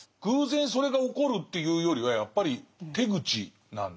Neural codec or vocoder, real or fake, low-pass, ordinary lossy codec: none; real; none; none